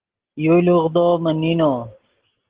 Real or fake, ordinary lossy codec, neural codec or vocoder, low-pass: real; Opus, 16 kbps; none; 3.6 kHz